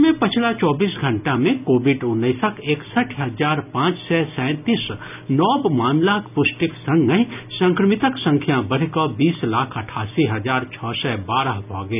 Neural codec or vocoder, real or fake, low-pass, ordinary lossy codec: none; real; 3.6 kHz; none